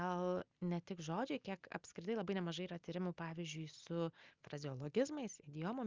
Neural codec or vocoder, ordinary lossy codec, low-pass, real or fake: none; Opus, 32 kbps; 7.2 kHz; real